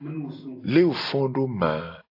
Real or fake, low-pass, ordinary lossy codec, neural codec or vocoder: real; 5.4 kHz; AAC, 32 kbps; none